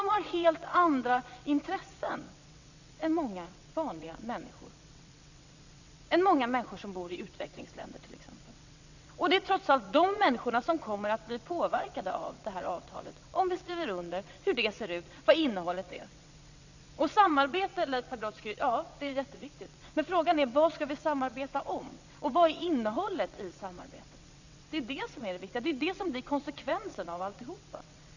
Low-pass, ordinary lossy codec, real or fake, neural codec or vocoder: 7.2 kHz; none; fake; vocoder, 22.05 kHz, 80 mel bands, WaveNeXt